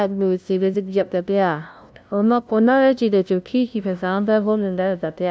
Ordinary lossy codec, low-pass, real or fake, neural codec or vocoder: none; none; fake; codec, 16 kHz, 0.5 kbps, FunCodec, trained on LibriTTS, 25 frames a second